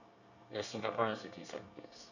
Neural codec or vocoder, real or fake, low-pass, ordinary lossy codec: codec, 24 kHz, 1 kbps, SNAC; fake; 7.2 kHz; none